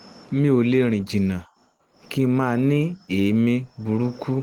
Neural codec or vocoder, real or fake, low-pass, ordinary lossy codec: none; real; 19.8 kHz; Opus, 16 kbps